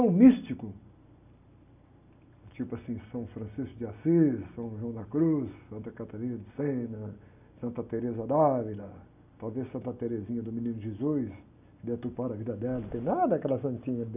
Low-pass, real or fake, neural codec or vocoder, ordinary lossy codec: 3.6 kHz; real; none; none